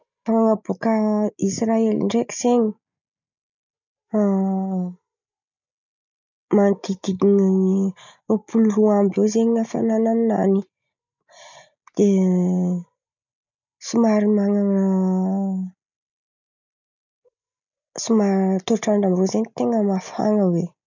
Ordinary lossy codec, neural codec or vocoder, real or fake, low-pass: none; none; real; 7.2 kHz